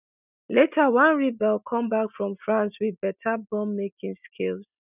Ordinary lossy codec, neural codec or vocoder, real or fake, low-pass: none; none; real; 3.6 kHz